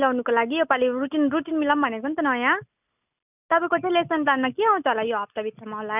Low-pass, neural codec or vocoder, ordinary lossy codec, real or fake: 3.6 kHz; none; none; real